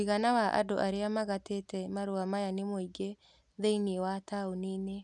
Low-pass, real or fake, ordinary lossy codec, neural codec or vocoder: 10.8 kHz; real; none; none